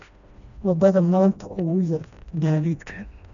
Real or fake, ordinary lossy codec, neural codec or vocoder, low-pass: fake; none; codec, 16 kHz, 1 kbps, FreqCodec, smaller model; 7.2 kHz